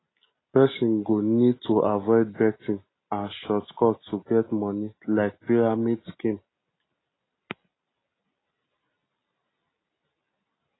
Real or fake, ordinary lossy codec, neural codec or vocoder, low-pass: real; AAC, 16 kbps; none; 7.2 kHz